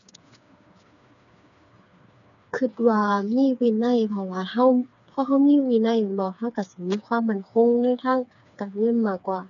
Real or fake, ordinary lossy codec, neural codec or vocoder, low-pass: fake; none; codec, 16 kHz, 4 kbps, FreqCodec, smaller model; 7.2 kHz